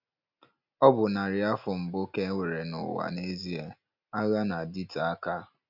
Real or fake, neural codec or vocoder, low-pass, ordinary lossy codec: real; none; 5.4 kHz; none